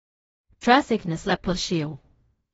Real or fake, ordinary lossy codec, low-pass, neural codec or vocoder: fake; AAC, 24 kbps; 10.8 kHz; codec, 16 kHz in and 24 kHz out, 0.4 kbps, LongCat-Audio-Codec, fine tuned four codebook decoder